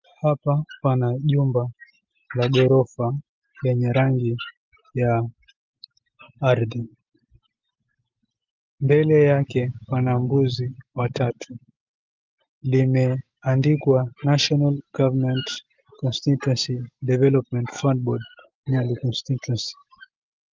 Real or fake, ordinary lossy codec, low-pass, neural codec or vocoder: real; Opus, 24 kbps; 7.2 kHz; none